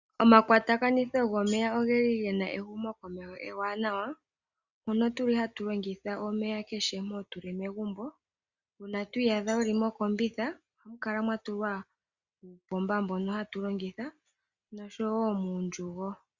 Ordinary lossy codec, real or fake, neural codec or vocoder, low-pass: Opus, 64 kbps; real; none; 7.2 kHz